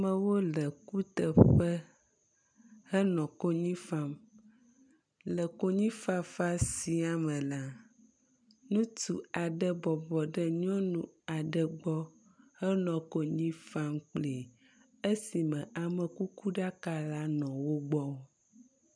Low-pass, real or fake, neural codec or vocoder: 9.9 kHz; real; none